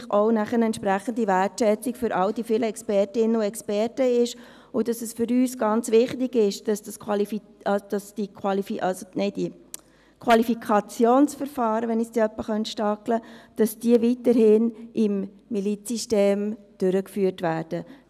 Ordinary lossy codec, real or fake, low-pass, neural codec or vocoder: none; real; 14.4 kHz; none